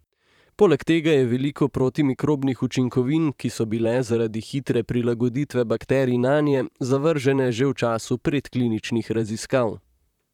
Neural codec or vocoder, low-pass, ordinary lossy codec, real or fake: vocoder, 44.1 kHz, 128 mel bands, Pupu-Vocoder; 19.8 kHz; none; fake